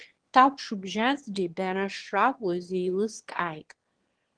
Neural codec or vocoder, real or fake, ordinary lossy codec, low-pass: autoencoder, 22.05 kHz, a latent of 192 numbers a frame, VITS, trained on one speaker; fake; Opus, 16 kbps; 9.9 kHz